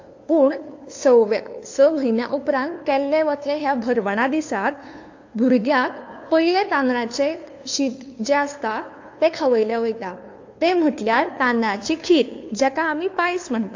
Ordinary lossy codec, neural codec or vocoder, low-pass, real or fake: AAC, 48 kbps; codec, 16 kHz, 2 kbps, FunCodec, trained on LibriTTS, 25 frames a second; 7.2 kHz; fake